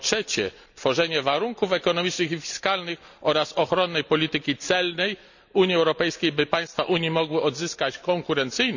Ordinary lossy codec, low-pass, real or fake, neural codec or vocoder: none; 7.2 kHz; real; none